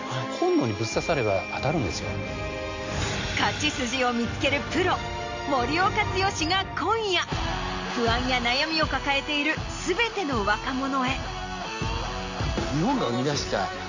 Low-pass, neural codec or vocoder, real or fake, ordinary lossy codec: 7.2 kHz; none; real; AAC, 48 kbps